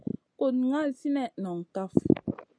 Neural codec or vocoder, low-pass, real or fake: none; 9.9 kHz; real